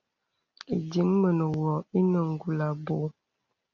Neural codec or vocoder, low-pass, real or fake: none; 7.2 kHz; real